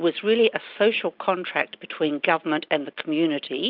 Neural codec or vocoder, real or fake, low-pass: none; real; 5.4 kHz